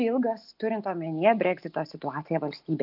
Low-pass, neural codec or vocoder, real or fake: 5.4 kHz; none; real